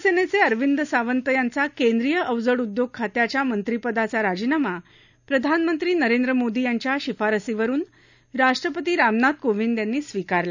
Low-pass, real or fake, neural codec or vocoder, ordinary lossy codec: 7.2 kHz; real; none; none